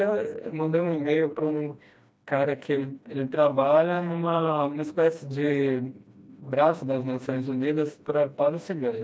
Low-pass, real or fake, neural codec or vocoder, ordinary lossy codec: none; fake; codec, 16 kHz, 1 kbps, FreqCodec, smaller model; none